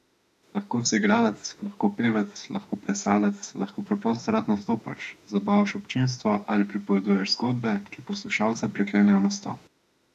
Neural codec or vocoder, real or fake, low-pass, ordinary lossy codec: autoencoder, 48 kHz, 32 numbers a frame, DAC-VAE, trained on Japanese speech; fake; 14.4 kHz; none